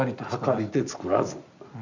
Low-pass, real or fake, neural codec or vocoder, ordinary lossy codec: 7.2 kHz; real; none; none